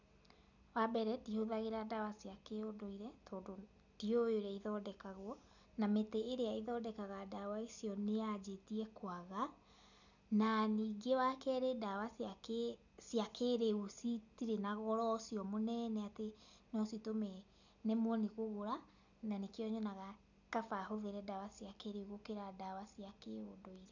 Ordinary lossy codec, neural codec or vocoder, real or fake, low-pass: none; none; real; 7.2 kHz